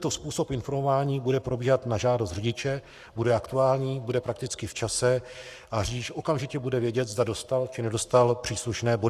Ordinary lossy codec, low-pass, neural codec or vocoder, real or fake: Opus, 64 kbps; 14.4 kHz; codec, 44.1 kHz, 7.8 kbps, Pupu-Codec; fake